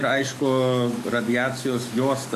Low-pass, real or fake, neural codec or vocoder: 14.4 kHz; fake; codec, 44.1 kHz, 7.8 kbps, DAC